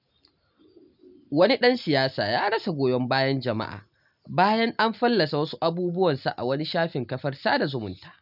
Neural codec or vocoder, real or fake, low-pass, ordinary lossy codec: none; real; 5.4 kHz; none